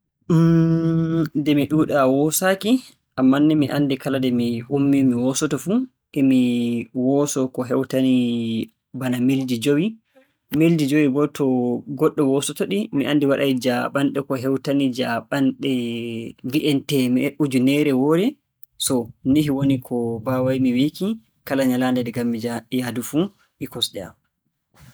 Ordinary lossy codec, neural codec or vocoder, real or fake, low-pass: none; none; real; none